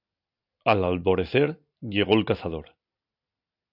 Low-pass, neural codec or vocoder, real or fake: 5.4 kHz; none; real